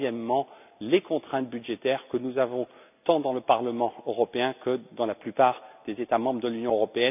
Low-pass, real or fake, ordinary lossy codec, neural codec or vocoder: 3.6 kHz; real; none; none